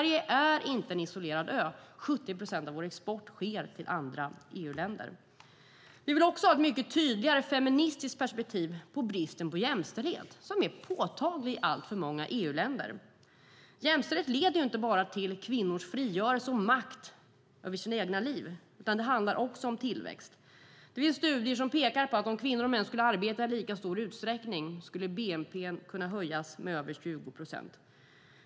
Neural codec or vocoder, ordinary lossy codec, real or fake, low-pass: none; none; real; none